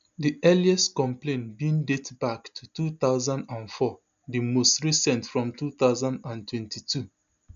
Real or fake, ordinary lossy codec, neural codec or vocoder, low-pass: real; none; none; 7.2 kHz